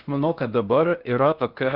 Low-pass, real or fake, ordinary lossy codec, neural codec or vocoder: 5.4 kHz; fake; Opus, 24 kbps; codec, 16 kHz in and 24 kHz out, 0.6 kbps, FocalCodec, streaming, 4096 codes